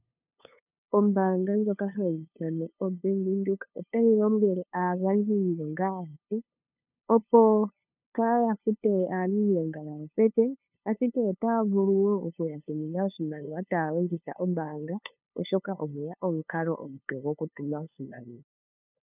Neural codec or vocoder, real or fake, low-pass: codec, 16 kHz, 2 kbps, FunCodec, trained on LibriTTS, 25 frames a second; fake; 3.6 kHz